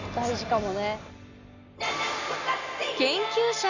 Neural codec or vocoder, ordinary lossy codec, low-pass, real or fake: none; none; 7.2 kHz; real